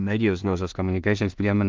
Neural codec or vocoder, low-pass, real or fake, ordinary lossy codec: codec, 16 kHz in and 24 kHz out, 0.4 kbps, LongCat-Audio-Codec, two codebook decoder; 7.2 kHz; fake; Opus, 24 kbps